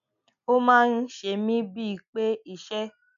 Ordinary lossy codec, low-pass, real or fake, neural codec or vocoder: none; 7.2 kHz; real; none